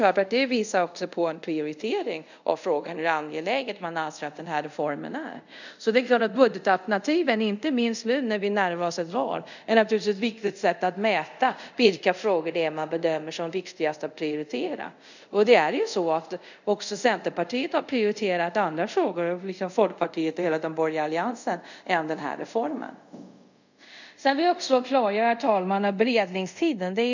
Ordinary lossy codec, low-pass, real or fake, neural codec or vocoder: none; 7.2 kHz; fake; codec, 24 kHz, 0.5 kbps, DualCodec